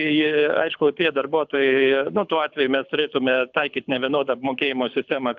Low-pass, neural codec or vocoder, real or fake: 7.2 kHz; codec, 24 kHz, 6 kbps, HILCodec; fake